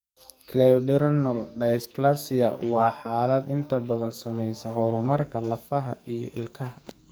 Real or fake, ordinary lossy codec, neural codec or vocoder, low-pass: fake; none; codec, 44.1 kHz, 2.6 kbps, SNAC; none